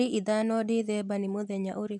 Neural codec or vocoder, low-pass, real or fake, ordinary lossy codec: none; 10.8 kHz; real; none